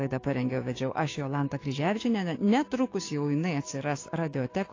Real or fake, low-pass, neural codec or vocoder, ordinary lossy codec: fake; 7.2 kHz; codec, 16 kHz, 6 kbps, DAC; AAC, 32 kbps